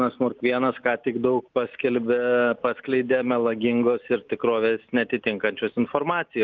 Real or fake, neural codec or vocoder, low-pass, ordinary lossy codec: real; none; 7.2 kHz; Opus, 32 kbps